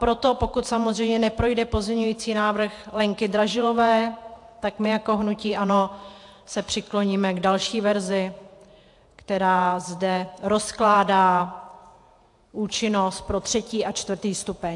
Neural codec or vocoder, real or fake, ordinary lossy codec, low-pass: vocoder, 48 kHz, 128 mel bands, Vocos; fake; AAC, 64 kbps; 10.8 kHz